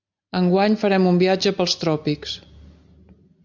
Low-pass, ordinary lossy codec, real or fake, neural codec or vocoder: 7.2 kHz; MP3, 64 kbps; real; none